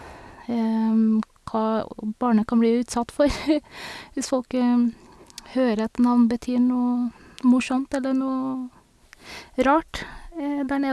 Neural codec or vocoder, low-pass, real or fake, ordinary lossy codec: none; none; real; none